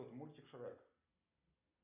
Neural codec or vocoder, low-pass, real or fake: none; 3.6 kHz; real